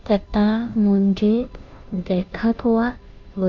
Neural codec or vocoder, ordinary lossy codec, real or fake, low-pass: codec, 16 kHz, 0.5 kbps, FunCodec, trained on Chinese and English, 25 frames a second; none; fake; 7.2 kHz